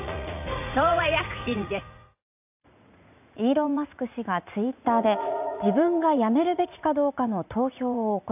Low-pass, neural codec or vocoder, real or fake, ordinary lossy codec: 3.6 kHz; vocoder, 44.1 kHz, 80 mel bands, Vocos; fake; AAC, 32 kbps